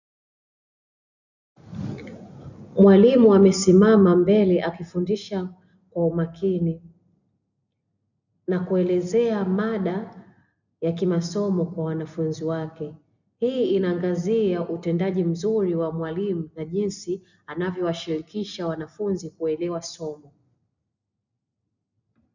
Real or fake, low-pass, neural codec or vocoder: real; 7.2 kHz; none